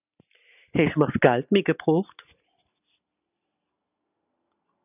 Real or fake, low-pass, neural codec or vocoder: real; 3.6 kHz; none